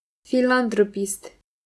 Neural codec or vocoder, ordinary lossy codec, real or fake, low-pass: none; none; real; none